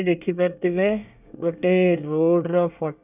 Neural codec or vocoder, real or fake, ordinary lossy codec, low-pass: codec, 24 kHz, 1 kbps, SNAC; fake; none; 3.6 kHz